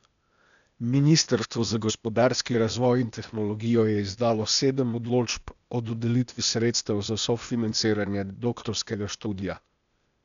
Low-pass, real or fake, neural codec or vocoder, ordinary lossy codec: 7.2 kHz; fake; codec, 16 kHz, 0.8 kbps, ZipCodec; none